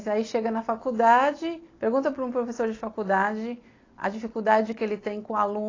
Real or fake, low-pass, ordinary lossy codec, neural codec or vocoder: real; 7.2 kHz; AAC, 32 kbps; none